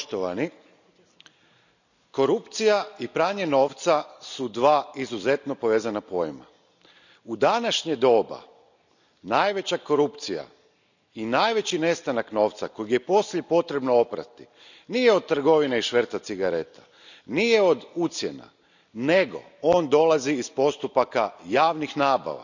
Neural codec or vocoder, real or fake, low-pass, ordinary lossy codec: none; real; 7.2 kHz; none